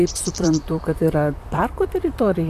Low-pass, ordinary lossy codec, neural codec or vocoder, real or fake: 14.4 kHz; MP3, 96 kbps; vocoder, 44.1 kHz, 128 mel bands, Pupu-Vocoder; fake